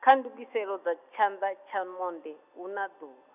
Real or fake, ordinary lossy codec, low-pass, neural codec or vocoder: real; none; 3.6 kHz; none